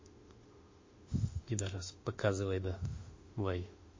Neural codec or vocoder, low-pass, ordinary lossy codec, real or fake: autoencoder, 48 kHz, 32 numbers a frame, DAC-VAE, trained on Japanese speech; 7.2 kHz; MP3, 32 kbps; fake